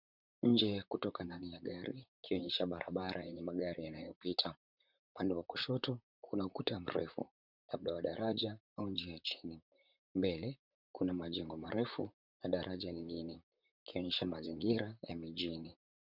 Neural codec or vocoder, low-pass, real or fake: vocoder, 44.1 kHz, 128 mel bands, Pupu-Vocoder; 5.4 kHz; fake